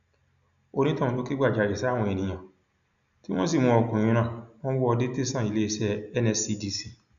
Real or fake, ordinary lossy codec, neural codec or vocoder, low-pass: real; none; none; 7.2 kHz